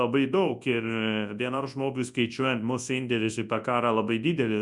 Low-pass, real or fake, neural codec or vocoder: 10.8 kHz; fake; codec, 24 kHz, 0.9 kbps, WavTokenizer, large speech release